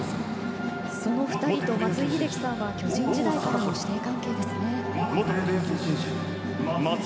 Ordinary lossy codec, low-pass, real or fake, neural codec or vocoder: none; none; real; none